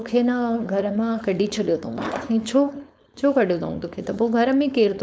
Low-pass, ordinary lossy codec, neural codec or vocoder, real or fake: none; none; codec, 16 kHz, 4.8 kbps, FACodec; fake